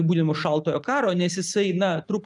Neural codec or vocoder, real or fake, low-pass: none; real; 10.8 kHz